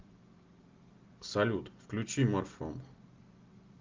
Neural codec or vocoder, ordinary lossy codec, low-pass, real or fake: none; Opus, 24 kbps; 7.2 kHz; real